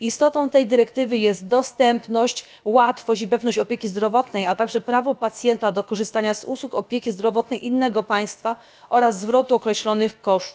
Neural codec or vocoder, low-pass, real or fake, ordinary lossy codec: codec, 16 kHz, about 1 kbps, DyCAST, with the encoder's durations; none; fake; none